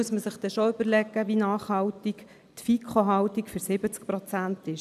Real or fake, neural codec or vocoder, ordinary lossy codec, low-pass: real; none; none; 14.4 kHz